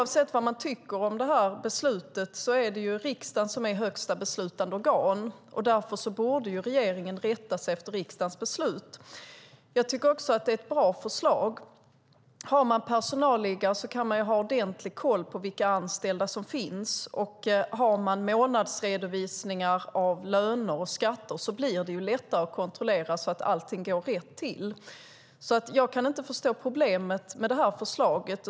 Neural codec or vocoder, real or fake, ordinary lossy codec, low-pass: none; real; none; none